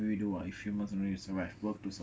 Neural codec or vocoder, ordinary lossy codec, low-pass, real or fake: none; none; none; real